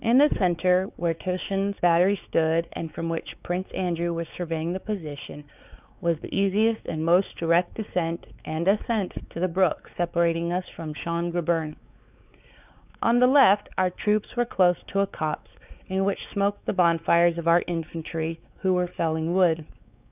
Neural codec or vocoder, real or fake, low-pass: codec, 16 kHz, 4 kbps, X-Codec, WavLM features, trained on Multilingual LibriSpeech; fake; 3.6 kHz